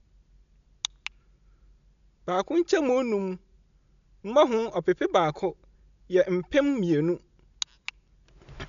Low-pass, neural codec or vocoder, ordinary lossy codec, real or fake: 7.2 kHz; none; none; real